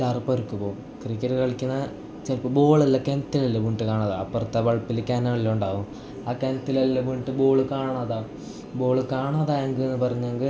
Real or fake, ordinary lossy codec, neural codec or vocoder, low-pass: real; none; none; none